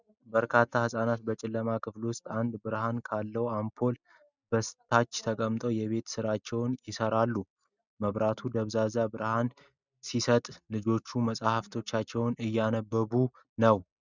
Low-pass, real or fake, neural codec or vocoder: 7.2 kHz; real; none